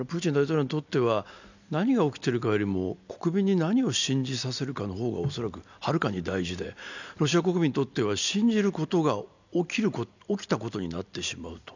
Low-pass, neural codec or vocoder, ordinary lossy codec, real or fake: 7.2 kHz; none; none; real